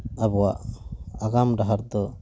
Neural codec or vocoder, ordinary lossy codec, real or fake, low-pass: none; none; real; none